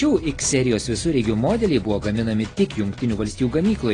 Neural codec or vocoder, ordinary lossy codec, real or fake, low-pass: none; AAC, 32 kbps; real; 9.9 kHz